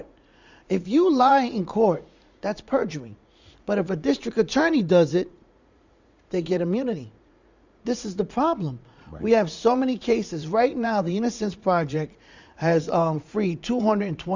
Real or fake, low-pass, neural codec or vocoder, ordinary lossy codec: fake; 7.2 kHz; vocoder, 44.1 kHz, 80 mel bands, Vocos; Opus, 64 kbps